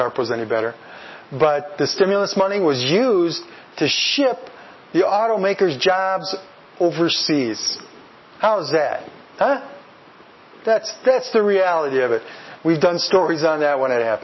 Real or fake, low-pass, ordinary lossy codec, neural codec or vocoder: real; 7.2 kHz; MP3, 24 kbps; none